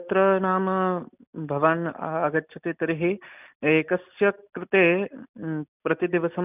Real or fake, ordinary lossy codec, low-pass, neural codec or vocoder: real; none; 3.6 kHz; none